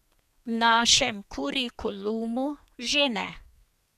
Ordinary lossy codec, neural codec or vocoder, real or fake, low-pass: none; codec, 32 kHz, 1.9 kbps, SNAC; fake; 14.4 kHz